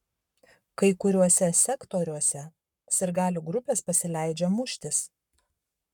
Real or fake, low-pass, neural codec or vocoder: fake; 19.8 kHz; codec, 44.1 kHz, 7.8 kbps, Pupu-Codec